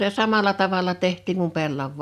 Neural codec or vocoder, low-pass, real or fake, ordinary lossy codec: none; 14.4 kHz; real; none